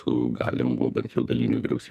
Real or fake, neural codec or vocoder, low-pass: fake; codec, 44.1 kHz, 2.6 kbps, SNAC; 14.4 kHz